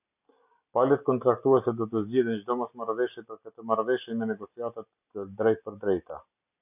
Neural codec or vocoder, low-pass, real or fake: none; 3.6 kHz; real